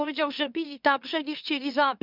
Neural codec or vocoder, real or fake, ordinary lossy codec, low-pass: autoencoder, 44.1 kHz, a latent of 192 numbers a frame, MeloTTS; fake; none; 5.4 kHz